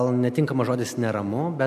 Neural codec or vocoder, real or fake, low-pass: none; real; 14.4 kHz